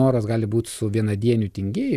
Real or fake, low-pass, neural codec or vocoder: fake; 14.4 kHz; vocoder, 44.1 kHz, 128 mel bands every 256 samples, BigVGAN v2